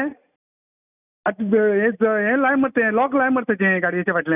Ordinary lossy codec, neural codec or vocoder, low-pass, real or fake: none; none; 3.6 kHz; real